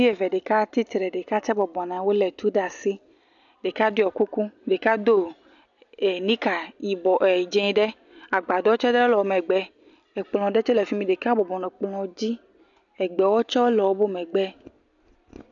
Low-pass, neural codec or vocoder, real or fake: 7.2 kHz; none; real